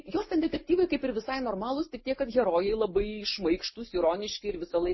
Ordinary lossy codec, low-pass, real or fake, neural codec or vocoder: MP3, 24 kbps; 7.2 kHz; real; none